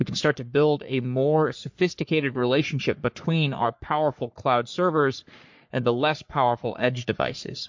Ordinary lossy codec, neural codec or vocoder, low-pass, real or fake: MP3, 48 kbps; codec, 44.1 kHz, 3.4 kbps, Pupu-Codec; 7.2 kHz; fake